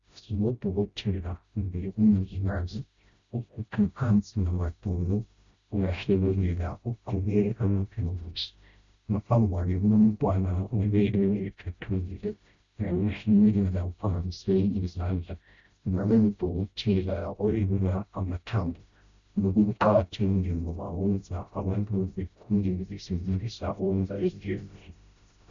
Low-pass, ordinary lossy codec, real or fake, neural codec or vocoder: 7.2 kHz; Opus, 64 kbps; fake; codec, 16 kHz, 0.5 kbps, FreqCodec, smaller model